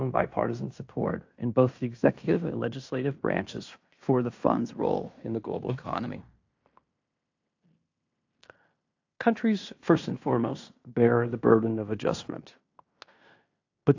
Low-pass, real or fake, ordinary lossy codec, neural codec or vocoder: 7.2 kHz; fake; AAC, 48 kbps; codec, 16 kHz in and 24 kHz out, 0.9 kbps, LongCat-Audio-Codec, fine tuned four codebook decoder